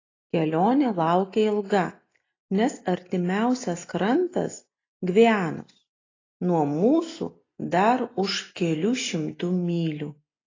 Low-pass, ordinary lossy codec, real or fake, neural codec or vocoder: 7.2 kHz; AAC, 32 kbps; real; none